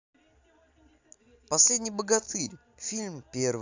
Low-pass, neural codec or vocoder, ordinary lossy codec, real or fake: 7.2 kHz; none; AAC, 48 kbps; real